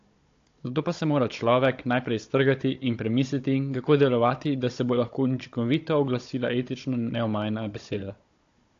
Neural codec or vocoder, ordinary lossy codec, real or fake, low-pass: codec, 16 kHz, 16 kbps, FunCodec, trained on Chinese and English, 50 frames a second; AAC, 48 kbps; fake; 7.2 kHz